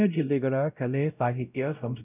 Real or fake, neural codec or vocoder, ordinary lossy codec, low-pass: fake; codec, 16 kHz, 0.5 kbps, X-Codec, WavLM features, trained on Multilingual LibriSpeech; none; 3.6 kHz